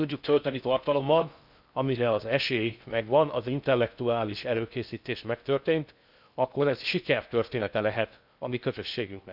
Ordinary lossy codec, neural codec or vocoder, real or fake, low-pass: none; codec, 16 kHz in and 24 kHz out, 0.6 kbps, FocalCodec, streaming, 4096 codes; fake; 5.4 kHz